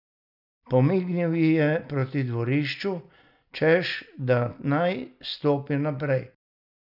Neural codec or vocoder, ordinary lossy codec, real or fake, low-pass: none; none; real; 5.4 kHz